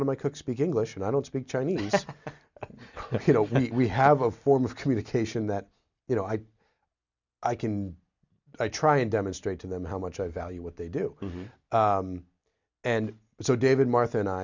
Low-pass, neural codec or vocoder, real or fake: 7.2 kHz; none; real